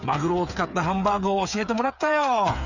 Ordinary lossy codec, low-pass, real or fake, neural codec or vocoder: none; 7.2 kHz; fake; codec, 16 kHz, 8 kbps, FreqCodec, smaller model